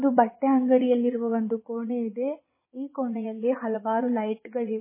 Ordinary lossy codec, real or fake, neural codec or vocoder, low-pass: MP3, 16 kbps; fake; vocoder, 22.05 kHz, 80 mel bands, Vocos; 3.6 kHz